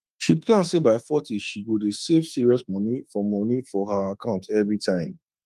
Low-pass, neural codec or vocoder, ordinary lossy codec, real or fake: 14.4 kHz; autoencoder, 48 kHz, 32 numbers a frame, DAC-VAE, trained on Japanese speech; Opus, 32 kbps; fake